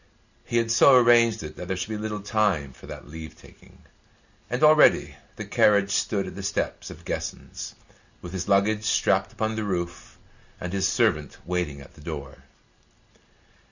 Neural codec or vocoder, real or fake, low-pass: none; real; 7.2 kHz